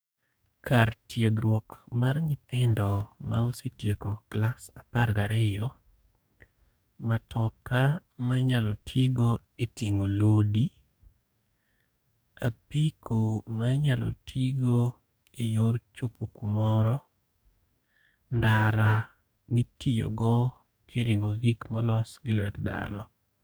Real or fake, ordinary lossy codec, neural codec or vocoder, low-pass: fake; none; codec, 44.1 kHz, 2.6 kbps, DAC; none